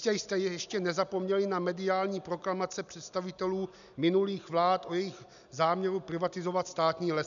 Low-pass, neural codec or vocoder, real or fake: 7.2 kHz; none; real